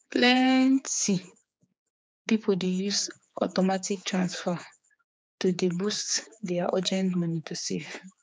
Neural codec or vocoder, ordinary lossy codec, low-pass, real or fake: codec, 16 kHz, 4 kbps, X-Codec, HuBERT features, trained on general audio; none; none; fake